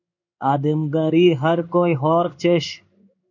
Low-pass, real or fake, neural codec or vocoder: 7.2 kHz; fake; codec, 16 kHz in and 24 kHz out, 1 kbps, XY-Tokenizer